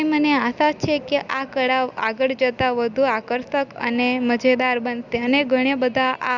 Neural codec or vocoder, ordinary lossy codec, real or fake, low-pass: none; none; real; 7.2 kHz